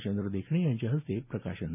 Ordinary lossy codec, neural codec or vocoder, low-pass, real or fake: none; none; 3.6 kHz; real